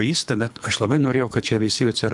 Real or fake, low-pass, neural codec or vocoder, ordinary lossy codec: fake; 10.8 kHz; codec, 24 kHz, 3 kbps, HILCodec; MP3, 96 kbps